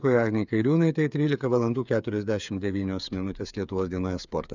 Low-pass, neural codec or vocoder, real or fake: 7.2 kHz; codec, 16 kHz, 8 kbps, FreqCodec, smaller model; fake